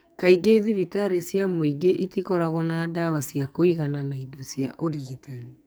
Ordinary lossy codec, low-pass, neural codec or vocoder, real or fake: none; none; codec, 44.1 kHz, 2.6 kbps, SNAC; fake